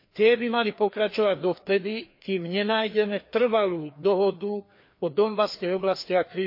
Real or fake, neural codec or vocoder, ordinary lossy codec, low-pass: fake; codec, 16 kHz, 2 kbps, FreqCodec, larger model; MP3, 32 kbps; 5.4 kHz